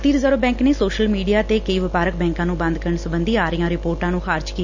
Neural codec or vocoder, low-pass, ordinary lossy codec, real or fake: none; 7.2 kHz; none; real